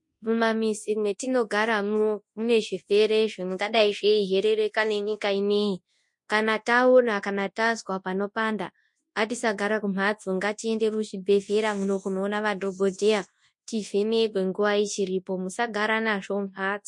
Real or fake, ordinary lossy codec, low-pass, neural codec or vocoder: fake; MP3, 48 kbps; 10.8 kHz; codec, 24 kHz, 0.9 kbps, WavTokenizer, large speech release